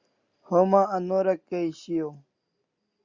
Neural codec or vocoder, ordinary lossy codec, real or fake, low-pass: none; Opus, 64 kbps; real; 7.2 kHz